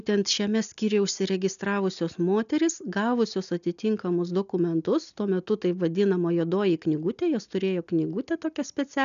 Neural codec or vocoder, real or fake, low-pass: none; real; 7.2 kHz